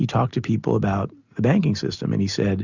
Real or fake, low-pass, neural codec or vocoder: real; 7.2 kHz; none